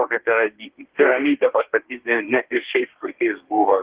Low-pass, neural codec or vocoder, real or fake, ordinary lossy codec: 3.6 kHz; codec, 32 kHz, 1.9 kbps, SNAC; fake; Opus, 16 kbps